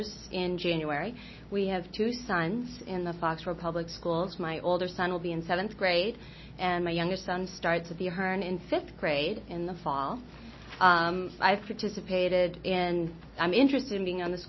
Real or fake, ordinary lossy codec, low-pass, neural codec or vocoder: real; MP3, 24 kbps; 7.2 kHz; none